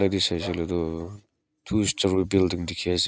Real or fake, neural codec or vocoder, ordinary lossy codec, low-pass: real; none; none; none